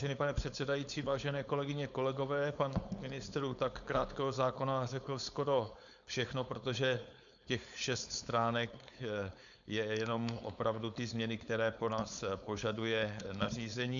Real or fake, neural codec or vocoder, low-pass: fake; codec, 16 kHz, 4.8 kbps, FACodec; 7.2 kHz